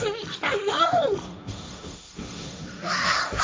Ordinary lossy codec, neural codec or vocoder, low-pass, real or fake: none; codec, 16 kHz, 1.1 kbps, Voila-Tokenizer; none; fake